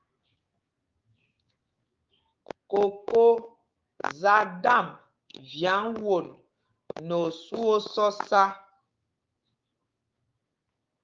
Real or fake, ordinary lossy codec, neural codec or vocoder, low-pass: fake; Opus, 32 kbps; codec, 16 kHz, 6 kbps, DAC; 7.2 kHz